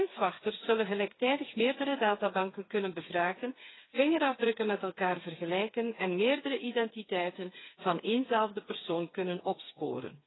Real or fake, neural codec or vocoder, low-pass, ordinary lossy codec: fake; codec, 16 kHz, 4 kbps, FreqCodec, smaller model; 7.2 kHz; AAC, 16 kbps